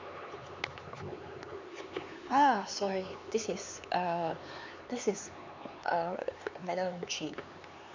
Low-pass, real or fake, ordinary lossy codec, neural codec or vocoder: 7.2 kHz; fake; MP3, 64 kbps; codec, 16 kHz, 4 kbps, X-Codec, HuBERT features, trained on LibriSpeech